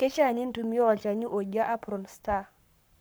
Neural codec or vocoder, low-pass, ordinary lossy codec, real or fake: codec, 44.1 kHz, 7.8 kbps, Pupu-Codec; none; none; fake